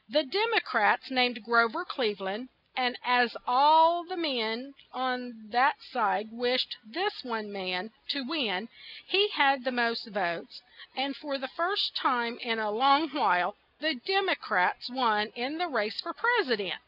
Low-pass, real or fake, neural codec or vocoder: 5.4 kHz; real; none